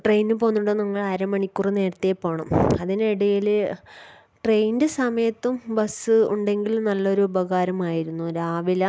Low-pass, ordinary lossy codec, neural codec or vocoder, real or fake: none; none; none; real